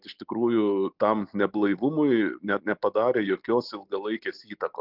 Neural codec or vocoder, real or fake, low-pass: none; real; 5.4 kHz